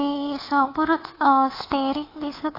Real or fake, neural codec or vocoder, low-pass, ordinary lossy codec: fake; codec, 24 kHz, 3.1 kbps, DualCodec; 5.4 kHz; AAC, 24 kbps